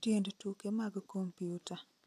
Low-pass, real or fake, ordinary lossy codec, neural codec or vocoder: 14.4 kHz; real; AAC, 64 kbps; none